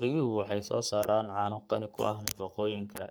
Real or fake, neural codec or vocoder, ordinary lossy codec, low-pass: fake; codec, 44.1 kHz, 3.4 kbps, Pupu-Codec; none; none